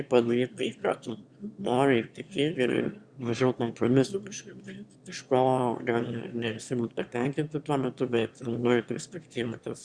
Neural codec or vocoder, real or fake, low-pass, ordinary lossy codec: autoencoder, 22.05 kHz, a latent of 192 numbers a frame, VITS, trained on one speaker; fake; 9.9 kHz; Opus, 64 kbps